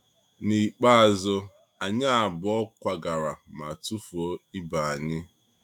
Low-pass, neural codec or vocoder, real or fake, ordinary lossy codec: 19.8 kHz; autoencoder, 48 kHz, 128 numbers a frame, DAC-VAE, trained on Japanese speech; fake; none